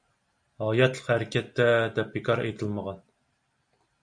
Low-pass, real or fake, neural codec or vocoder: 9.9 kHz; real; none